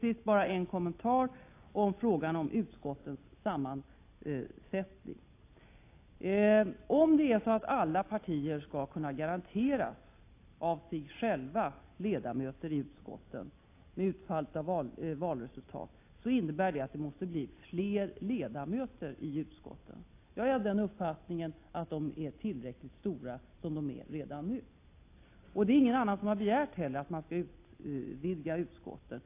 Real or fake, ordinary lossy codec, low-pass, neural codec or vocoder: real; AAC, 24 kbps; 3.6 kHz; none